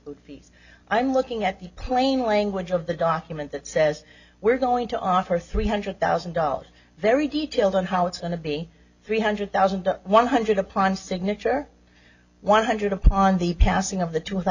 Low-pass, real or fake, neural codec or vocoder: 7.2 kHz; real; none